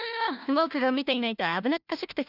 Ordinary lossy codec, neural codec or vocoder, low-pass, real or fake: none; codec, 16 kHz, 1 kbps, FunCodec, trained on LibriTTS, 50 frames a second; 5.4 kHz; fake